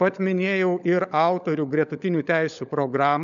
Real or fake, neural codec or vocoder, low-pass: fake; codec, 16 kHz, 16 kbps, FunCodec, trained on LibriTTS, 50 frames a second; 7.2 kHz